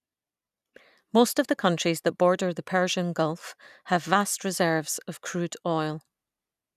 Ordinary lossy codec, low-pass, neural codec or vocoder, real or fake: none; 14.4 kHz; none; real